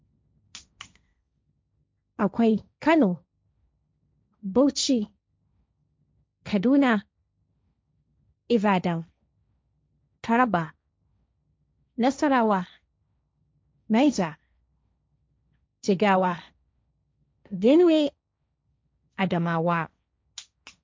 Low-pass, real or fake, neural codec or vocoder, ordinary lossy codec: none; fake; codec, 16 kHz, 1.1 kbps, Voila-Tokenizer; none